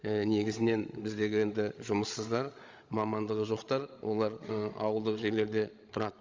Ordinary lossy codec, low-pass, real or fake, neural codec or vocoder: Opus, 32 kbps; 7.2 kHz; fake; codec, 16 kHz, 16 kbps, FreqCodec, larger model